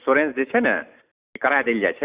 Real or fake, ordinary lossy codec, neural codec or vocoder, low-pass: real; Opus, 64 kbps; none; 3.6 kHz